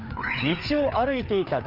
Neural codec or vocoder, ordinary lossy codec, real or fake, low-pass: codec, 16 kHz, 4 kbps, FreqCodec, larger model; Opus, 32 kbps; fake; 5.4 kHz